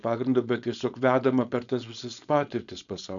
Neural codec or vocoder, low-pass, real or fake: codec, 16 kHz, 4.8 kbps, FACodec; 7.2 kHz; fake